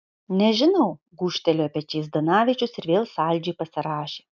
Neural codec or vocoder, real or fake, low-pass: none; real; 7.2 kHz